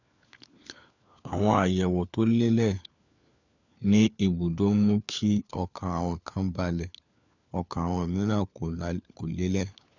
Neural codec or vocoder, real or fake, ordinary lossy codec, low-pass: codec, 16 kHz, 4 kbps, FunCodec, trained on LibriTTS, 50 frames a second; fake; none; 7.2 kHz